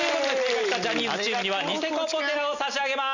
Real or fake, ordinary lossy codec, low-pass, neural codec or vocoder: real; none; 7.2 kHz; none